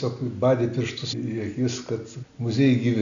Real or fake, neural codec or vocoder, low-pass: real; none; 7.2 kHz